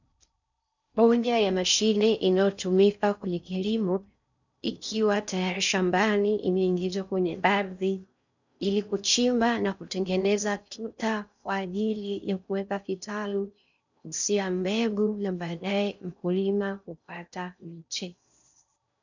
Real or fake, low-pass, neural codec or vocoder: fake; 7.2 kHz; codec, 16 kHz in and 24 kHz out, 0.6 kbps, FocalCodec, streaming, 4096 codes